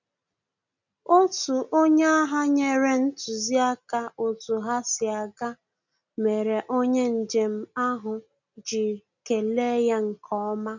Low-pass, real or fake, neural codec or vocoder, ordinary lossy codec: 7.2 kHz; real; none; none